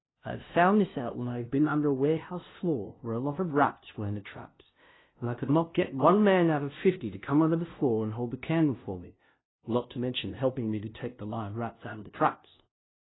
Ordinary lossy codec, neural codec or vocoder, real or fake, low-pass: AAC, 16 kbps; codec, 16 kHz, 0.5 kbps, FunCodec, trained on LibriTTS, 25 frames a second; fake; 7.2 kHz